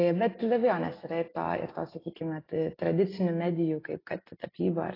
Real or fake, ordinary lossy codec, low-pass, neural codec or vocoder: real; AAC, 24 kbps; 5.4 kHz; none